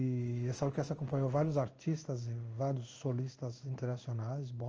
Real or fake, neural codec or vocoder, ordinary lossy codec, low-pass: fake; codec, 16 kHz in and 24 kHz out, 1 kbps, XY-Tokenizer; Opus, 24 kbps; 7.2 kHz